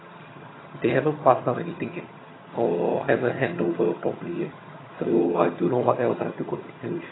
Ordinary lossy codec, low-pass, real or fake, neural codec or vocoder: AAC, 16 kbps; 7.2 kHz; fake; vocoder, 22.05 kHz, 80 mel bands, HiFi-GAN